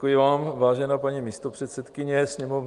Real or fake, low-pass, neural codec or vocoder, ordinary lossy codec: real; 10.8 kHz; none; Opus, 32 kbps